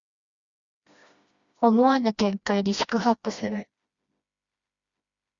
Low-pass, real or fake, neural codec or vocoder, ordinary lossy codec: 7.2 kHz; fake; codec, 16 kHz, 1 kbps, FreqCodec, smaller model; Opus, 64 kbps